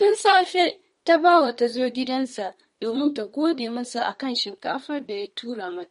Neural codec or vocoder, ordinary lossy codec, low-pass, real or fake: codec, 24 kHz, 1 kbps, SNAC; MP3, 48 kbps; 10.8 kHz; fake